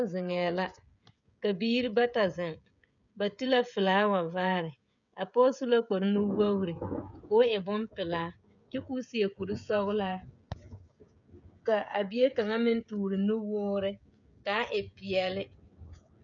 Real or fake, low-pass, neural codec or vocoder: fake; 7.2 kHz; codec, 16 kHz, 16 kbps, FreqCodec, smaller model